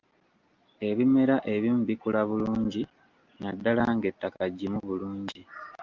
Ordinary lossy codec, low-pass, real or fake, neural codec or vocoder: Opus, 32 kbps; 7.2 kHz; real; none